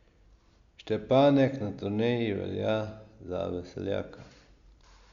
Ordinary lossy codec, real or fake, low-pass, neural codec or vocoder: Opus, 64 kbps; real; 7.2 kHz; none